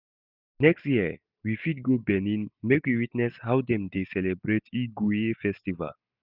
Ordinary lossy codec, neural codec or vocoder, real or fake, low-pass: none; none; real; 5.4 kHz